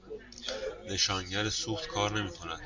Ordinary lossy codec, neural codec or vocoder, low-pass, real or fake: MP3, 48 kbps; none; 7.2 kHz; real